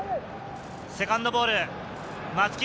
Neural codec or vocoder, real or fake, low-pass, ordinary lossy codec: none; real; none; none